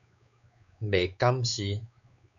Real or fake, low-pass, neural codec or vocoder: fake; 7.2 kHz; codec, 16 kHz, 4 kbps, X-Codec, WavLM features, trained on Multilingual LibriSpeech